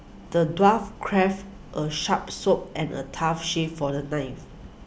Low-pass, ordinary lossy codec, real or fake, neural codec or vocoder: none; none; real; none